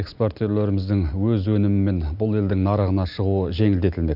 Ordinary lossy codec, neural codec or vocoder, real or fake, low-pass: none; none; real; 5.4 kHz